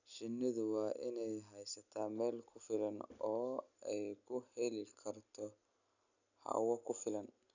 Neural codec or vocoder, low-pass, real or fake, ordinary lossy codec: none; 7.2 kHz; real; none